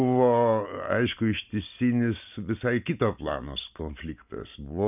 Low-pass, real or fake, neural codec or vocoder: 3.6 kHz; real; none